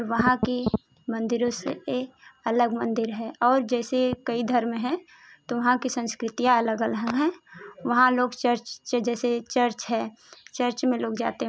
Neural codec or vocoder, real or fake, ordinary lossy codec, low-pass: none; real; none; none